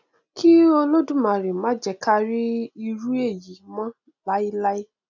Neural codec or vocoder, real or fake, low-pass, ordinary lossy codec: none; real; 7.2 kHz; none